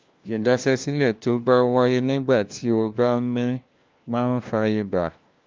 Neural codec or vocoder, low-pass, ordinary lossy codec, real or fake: codec, 16 kHz, 1 kbps, FunCodec, trained on LibriTTS, 50 frames a second; 7.2 kHz; Opus, 24 kbps; fake